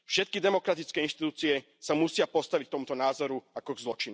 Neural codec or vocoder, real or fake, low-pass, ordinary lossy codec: none; real; none; none